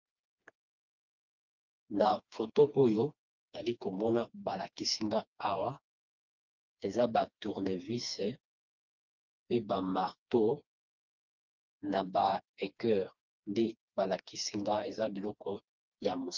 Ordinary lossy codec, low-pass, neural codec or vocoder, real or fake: Opus, 24 kbps; 7.2 kHz; codec, 16 kHz, 2 kbps, FreqCodec, smaller model; fake